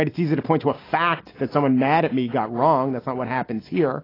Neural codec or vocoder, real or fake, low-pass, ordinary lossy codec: none; real; 5.4 kHz; AAC, 24 kbps